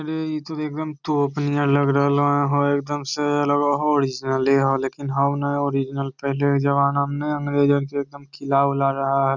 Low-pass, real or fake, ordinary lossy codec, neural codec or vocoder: 7.2 kHz; real; none; none